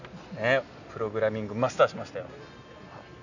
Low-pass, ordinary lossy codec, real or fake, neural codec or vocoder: 7.2 kHz; none; real; none